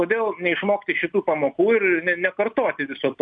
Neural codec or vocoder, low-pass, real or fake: none; 9.9 kHz; real